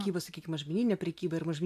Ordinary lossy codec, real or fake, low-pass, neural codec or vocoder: MP3, 96 kbps; real; 14.4 kHz; none